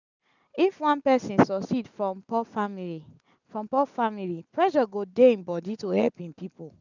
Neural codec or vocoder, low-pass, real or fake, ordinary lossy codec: none; 7.2 kHz; real; none